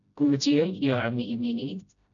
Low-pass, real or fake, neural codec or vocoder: 7.2 kHz; fake; codec, 16 kHz, 0.5 kbps, FreqCodec, smaller model